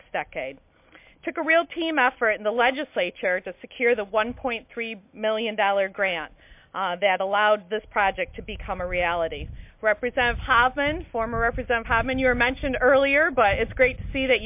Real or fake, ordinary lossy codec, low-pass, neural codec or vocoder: real; MP3, 32 kbps; 3.6 kHz; none